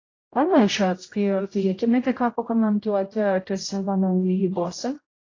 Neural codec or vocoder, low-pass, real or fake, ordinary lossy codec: codec, 16 kHz, 0.5 kbps, X-Codec, HuBERT features, trained on general audio; 7.2 kHz; fake; AAC, 32 kbps